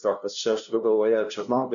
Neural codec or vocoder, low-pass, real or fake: codec, 16 kHz, 0.5 kbps, FunCodec, trained on LibriTTS, 25 frames a second; 7.2 kHz; fake